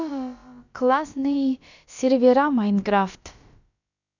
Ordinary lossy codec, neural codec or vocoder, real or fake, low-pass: none; codec, 16 kHz, about 1 kbps, DyCAST, with the encoder's durations; fake; 7.2 kHz